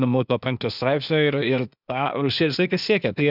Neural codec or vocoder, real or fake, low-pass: codec, 16 kHz, 0.8 kbps, ZipCodec; fake; 5.4 kHz